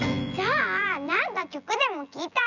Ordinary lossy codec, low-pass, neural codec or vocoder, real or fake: none; 7.2 kHz; vocoder, 24 kHz, 100 mel bands, Vocos; fake